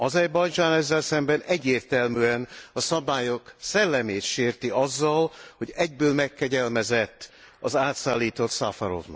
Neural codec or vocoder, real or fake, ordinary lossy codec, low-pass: none; real; none; none